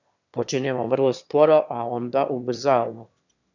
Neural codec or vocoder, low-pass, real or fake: autoencoder, 22.05 kHz, a latent of 192 numbers a frame, VITS, trained on one speaker; 7.2 kHz; fake